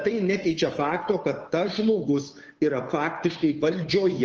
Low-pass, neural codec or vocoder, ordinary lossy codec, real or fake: 7.2 kHz; codec, 16 kHz, 2 kbps, FunCodec, trained on Chinese and English, 25 frames a second; Opus, 24 kbps; fake